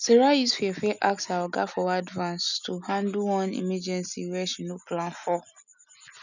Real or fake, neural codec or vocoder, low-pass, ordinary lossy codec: real; none; 7.2 kHz; none